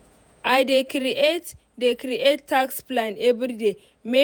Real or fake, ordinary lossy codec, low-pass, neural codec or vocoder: fake; none; none; vocoder, 48 kHz, 128 mel bands, Vocos